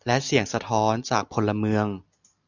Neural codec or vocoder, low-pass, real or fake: none; 7.2 kHz; real